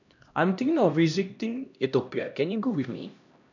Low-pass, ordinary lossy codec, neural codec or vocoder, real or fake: 7.2 kHz; none; codec, 16 kHz, 1 kbps, X-Codec, HuBERT features, trained on LibriSpeech; fake